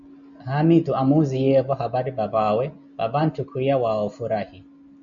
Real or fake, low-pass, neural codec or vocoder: real; 7.2 kHz; none